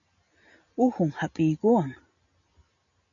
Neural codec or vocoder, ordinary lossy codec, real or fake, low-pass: none; MP3, 96 kbps; real; 7.2 kHz